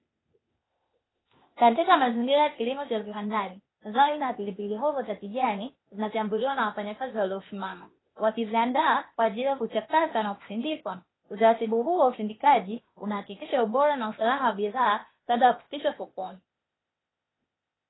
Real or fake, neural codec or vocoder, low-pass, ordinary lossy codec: fake; codec, 16 kHz, 0.8 kbps, ZipCodec; 7.2 kHz; AAC, 16 kbps